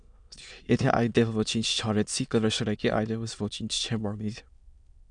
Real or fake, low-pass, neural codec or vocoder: fake; 9.9 kHz; autoencoder, 22.05 kHz, a latent of 192 numbers a frame, VITS, trained on many speakers